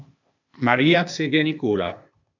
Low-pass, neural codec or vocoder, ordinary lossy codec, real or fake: 7.2 kHz; codec, 16 kHz, 0.8 kbps, ZipCodec; AAC, 48 kbps; fake